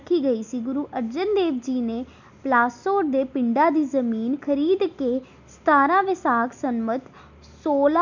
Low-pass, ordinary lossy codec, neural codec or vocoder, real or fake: 7.2 kHz; none; none; real